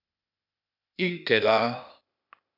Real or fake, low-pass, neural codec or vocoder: fake; 5.4 kHz; codec, 16 kHz, 0.8 kbps, ZipCodec